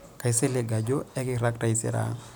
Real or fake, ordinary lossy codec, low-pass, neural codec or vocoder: real; none; none; none